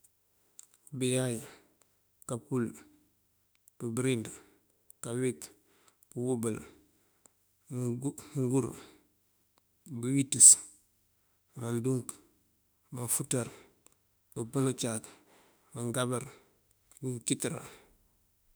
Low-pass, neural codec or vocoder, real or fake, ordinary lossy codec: none; autoencoder, 48 kHz, 32 numbers a frame, DAC-VAE, trained on Japanese speech; fake; none